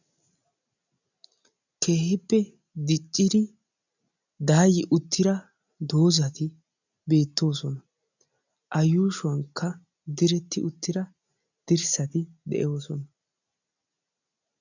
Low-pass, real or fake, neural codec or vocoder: 7.2 kHz; real; none